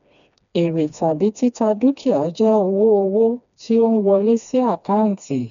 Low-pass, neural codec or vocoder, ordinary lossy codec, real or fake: 7.2 kHz; codec, 16 kHz, 2 kbps, FreqCodec, smaller model; none; fake